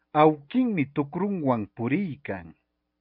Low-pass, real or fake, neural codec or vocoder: 5.4 kHz; real; none